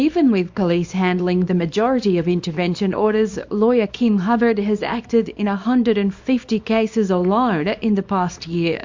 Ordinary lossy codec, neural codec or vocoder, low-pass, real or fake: MP3, 48 kbps; codec, 24 kHz, 0.9 kbps, WavTokenizer, small release; 7.2 kHz; fake